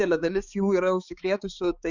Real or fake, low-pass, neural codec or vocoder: fake; 7.2 kHz; codec, 16 kHz, 4 kbps, X-Codec, HuBERT features, trained on balanced general audio